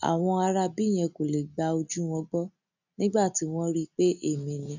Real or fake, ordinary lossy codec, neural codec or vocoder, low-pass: real; none; none; 7.2 kHz